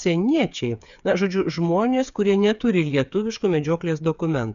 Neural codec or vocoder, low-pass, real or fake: codec, 16 kHz, 8 kbps, FreqCodec, smaller model; 7.2 kHz; fake